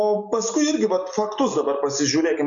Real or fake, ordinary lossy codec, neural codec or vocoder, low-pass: real; MP3, 64 kbps; none; 7.2 kHz